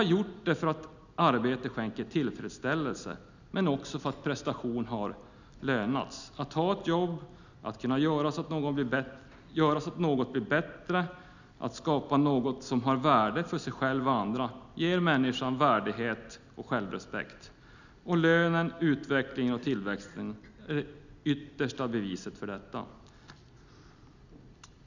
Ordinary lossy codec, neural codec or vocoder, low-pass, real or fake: none; none; 7.2 kHz; real